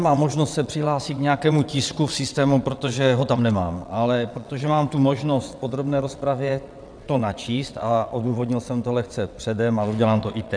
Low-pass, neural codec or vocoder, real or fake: 9.9 kHz; vocoder, 22.05 kHz, 80 mel bands, WaveNeXt; fake